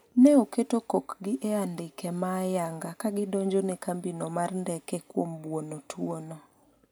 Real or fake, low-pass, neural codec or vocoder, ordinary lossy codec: real; none; none; none